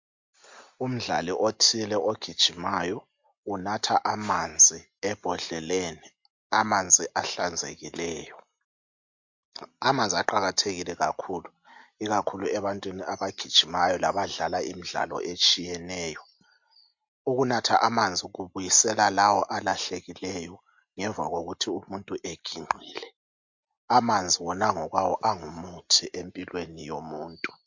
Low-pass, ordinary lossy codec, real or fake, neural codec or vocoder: 7.2 kHz; MP3, 48 kbps; real; none